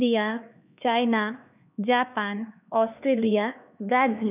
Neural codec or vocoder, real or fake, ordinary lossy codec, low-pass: codec, 16 kHz, 2 kbps, X-Codec, HuBERT features, trained on LibriSpeech; fake; none; 3.6 kHz